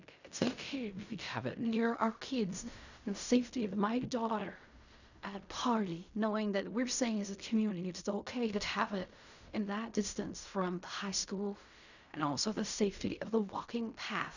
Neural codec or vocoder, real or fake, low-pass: codec, 16 kHz in and 24 kHz out, 0.4 kbps, LongCat-Audio-Codec, fine tuned four codebook decoder; fake; 7.2 kHz